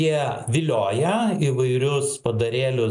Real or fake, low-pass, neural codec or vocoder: real; 10.8 kHz; none